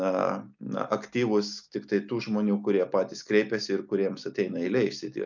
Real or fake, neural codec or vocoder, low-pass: real; none; 7.2 kHz